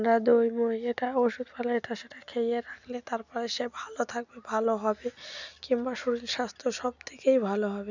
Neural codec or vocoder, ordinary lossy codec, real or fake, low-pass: none; none; real; 7.2 kHz